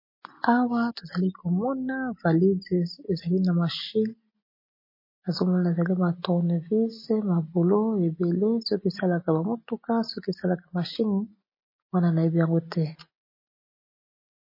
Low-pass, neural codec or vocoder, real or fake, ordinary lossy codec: 5.4 kHz; none; real; MP3, 24 kbps